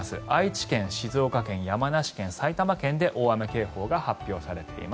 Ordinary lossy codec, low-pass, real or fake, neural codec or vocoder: none; none; real; none